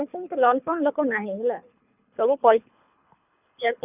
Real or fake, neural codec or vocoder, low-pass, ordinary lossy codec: fake; codec, 24 kHz, 3 kbps, HILCodec; 3.6 kHz; none